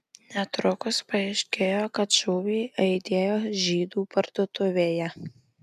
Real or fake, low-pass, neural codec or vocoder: real; 14.4 kHz; none